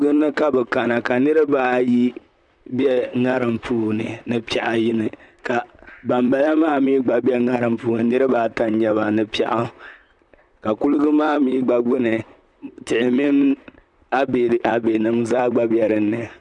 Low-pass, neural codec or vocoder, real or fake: 10.8 kHz; vocoder, 44.1 kHz, 128 mel bands, Pupu-Vocoder; fake